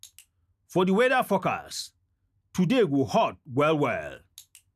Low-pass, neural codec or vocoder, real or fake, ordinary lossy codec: 14.4 kHz; none; real; none